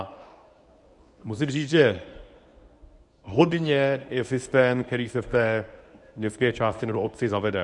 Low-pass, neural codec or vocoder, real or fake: 10.8 kHz; codec, 24 kHz, 0.9 kbps, WavTokenizer, medium speech release version 1; fake